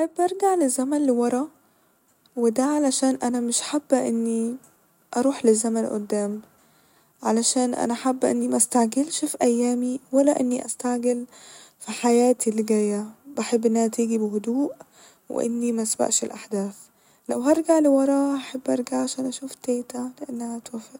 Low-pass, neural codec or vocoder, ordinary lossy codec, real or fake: 19.8 kHz; none; none; real